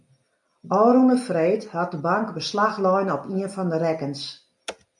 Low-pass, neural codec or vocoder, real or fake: 10.8 kHz; none; real